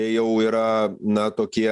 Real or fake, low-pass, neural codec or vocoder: real; 10.8 kHz; none